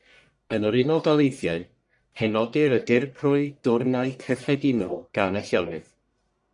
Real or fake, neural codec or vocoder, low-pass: fake; codec, 44.1 kHz, 1.7 kbps, Pupu-Codec; 10.8 kHz